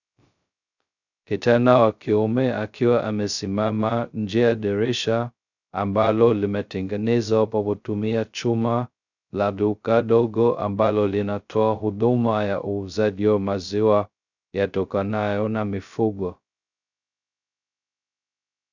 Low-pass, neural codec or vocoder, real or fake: 7.2 kHz; codec, 16 kHz, 0.2 kbps, FocalCodec; fake